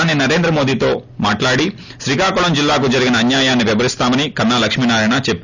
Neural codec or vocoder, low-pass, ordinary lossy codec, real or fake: none; 7.2 kHz; none; real